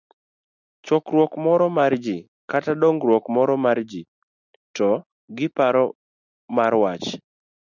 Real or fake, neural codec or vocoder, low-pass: real; none; 7.2 kHz